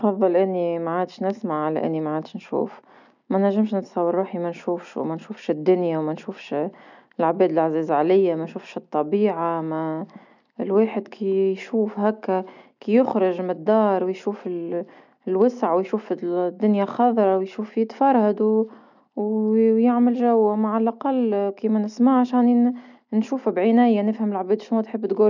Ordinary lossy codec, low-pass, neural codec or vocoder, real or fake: none; 7.2 kHz; none; real